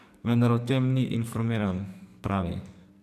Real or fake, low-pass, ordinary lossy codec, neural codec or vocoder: fake; 14.4 kHz; none; codec, 44.1 kHz, 2.6 kbps, SNAC